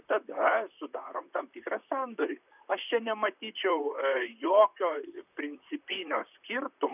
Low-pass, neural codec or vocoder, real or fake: 3.6 kHz; vocoder, 44.1 kHz, 128 mel bands, Pupu-Vocoder; fake